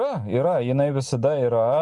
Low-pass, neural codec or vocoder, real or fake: 10.8 kHz; none; real